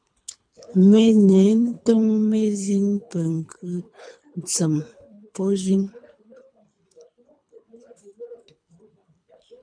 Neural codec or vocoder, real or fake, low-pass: codec, 24 kHz, 3 kbps, HILCodec; fake; 9.9 kHz